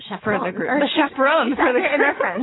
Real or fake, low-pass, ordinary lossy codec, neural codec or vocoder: fake; 7.2 kHz; AAC, 16 kbps; vocoder, 22.05 kHz, 80 mel bands, WaveNeXt